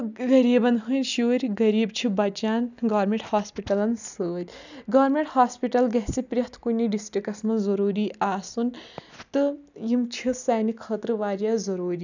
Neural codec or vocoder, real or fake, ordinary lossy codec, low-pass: none; real; none; 7.2 kHz